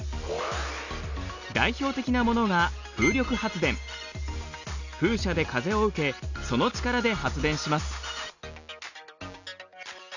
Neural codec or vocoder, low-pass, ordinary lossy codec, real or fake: none; 7.2 kHz; none; real